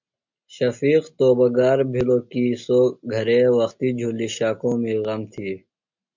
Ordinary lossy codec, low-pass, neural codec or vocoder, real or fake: MP3, 64 kbps; 7.2 kHz; none; real